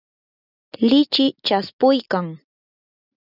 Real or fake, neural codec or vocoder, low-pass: real; none; 5.4 kHz